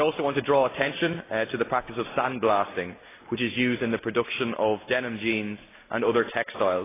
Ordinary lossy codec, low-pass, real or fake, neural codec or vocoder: AAC, 16 kbps; 3.6 kHz; real; none